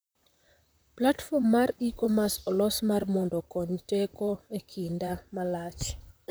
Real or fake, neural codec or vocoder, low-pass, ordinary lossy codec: fake; vocoder, 44.1 kHz, 128 mel bands, Pupu-Vocoder; none; none